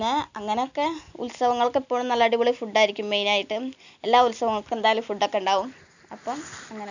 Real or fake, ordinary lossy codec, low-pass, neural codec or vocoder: real; none; 7.2 kHz; none